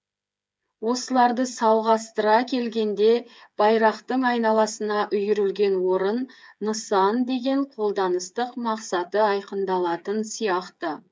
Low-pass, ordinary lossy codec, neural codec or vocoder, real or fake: none; none; codec, 16 kHz, 8 kbps, FreqCodec, smaller model; fake